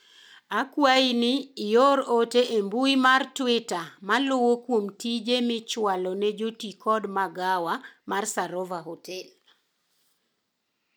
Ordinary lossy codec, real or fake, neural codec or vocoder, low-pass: none; real; none; none